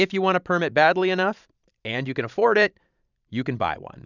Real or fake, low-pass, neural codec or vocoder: fake; 7.2 kHz; vocoder, 44.1 kHz, 128 mel bands every 512 samples, BigVGAN v2